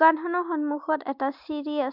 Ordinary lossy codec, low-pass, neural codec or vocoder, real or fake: MP3, 48 kbps; 5.4 kHz; none; real